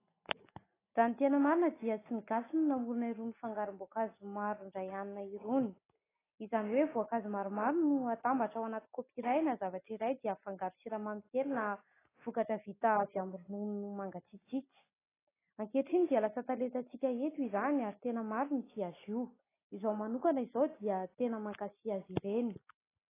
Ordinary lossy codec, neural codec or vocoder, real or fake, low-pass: AAC, 16 kbps; none; real; 3.6 kHz